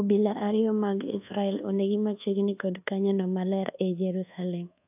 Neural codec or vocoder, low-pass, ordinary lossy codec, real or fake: codec, 24 kHz, 1.2 kbps, DualCodec; 3.6 kHz; AAC, 32 kbps; fake